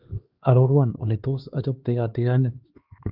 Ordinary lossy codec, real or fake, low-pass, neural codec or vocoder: Opus, 32 kbps; fake; 5.4 kHz; codec, 16 kHz, 2 kbps, X-Codec, HuBERT features, trained on LibriSpeech